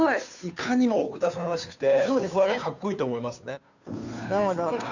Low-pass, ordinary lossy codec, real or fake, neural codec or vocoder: 7.2 kHz; none; fake; codec, 16 kHz, 2 kbps, FunCodec, trained on Chinese and English, 25 frames a second